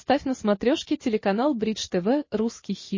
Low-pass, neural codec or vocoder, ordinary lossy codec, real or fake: 7.2 kHz; none; MP3, 32 kbps; real